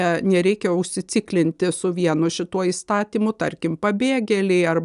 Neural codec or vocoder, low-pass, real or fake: none; 10.8 kHz; real